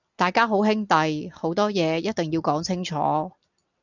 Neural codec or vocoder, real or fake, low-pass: none; real; 7.2 kHz